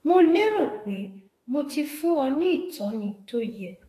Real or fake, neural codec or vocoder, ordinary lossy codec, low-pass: fake; autoencoder, 48 kHz, 32 numbers a frame, DAC-VAE, trained on Japanese speech; AAC, 48 kbps; 14.4 kHz